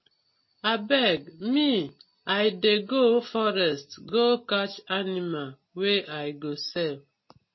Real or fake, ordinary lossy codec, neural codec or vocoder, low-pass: real; MP3, 24 kbps; none; 7.2 kHz